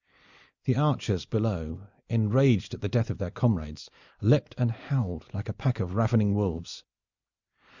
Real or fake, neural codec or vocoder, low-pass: real; none; 7.2 kHz